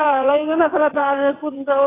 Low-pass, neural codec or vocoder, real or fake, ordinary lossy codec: 3.6 kHz; vocoder, 22.05 kHz, 80 mel bands, WaveNeXt; fake; AAC, 16 kbps